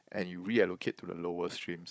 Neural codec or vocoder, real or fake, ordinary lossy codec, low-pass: codec, 16 kHz, 16 kbps, FunCodec, trained on Chinese and English, 50 frames a second; fake; none; none